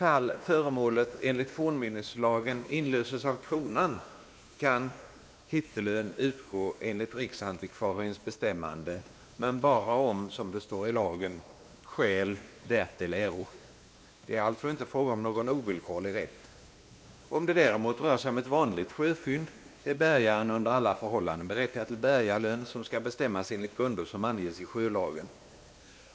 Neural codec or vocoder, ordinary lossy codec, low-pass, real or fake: codec, 16 kHz, 2 kbps, X-Codec, WavLM features, trained on Multilingual LibriSpeech; none; none; fake